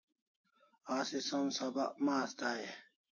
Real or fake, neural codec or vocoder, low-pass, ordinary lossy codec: fake; autoencoder, 48 kHz, 128 numbers a frame, DAC-VAE, trained on Japanese speech; 7.2 kHz; MP3, 32 kbps